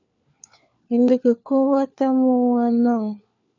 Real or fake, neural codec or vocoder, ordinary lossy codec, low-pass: fake; codec, 16 kHz, 4 kbps, FunCodec, trained on LibriTTS, 50 frames a second; MP3, 64 kbps; 7.2 kHz